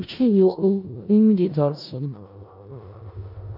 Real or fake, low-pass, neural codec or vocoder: fake; 5.4 kHz; codec, 16 kHz in and 24 kHz out, 0.4 kbps, LongCat-Audio-Codec, four codebook decoder